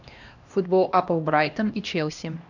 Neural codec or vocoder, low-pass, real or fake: codec, 16 kHz, 1 kbps, X-Codec, HuBERT features, trained on LibriSpeech; 7.2 kHz; fake